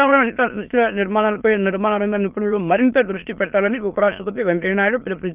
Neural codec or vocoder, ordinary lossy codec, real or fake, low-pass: autoencoder, 22.05 kHz, a latent of 192 numbers a frame, VITS, trained on many speakers; Opus, 32 kbps; fake; 3.6 kHz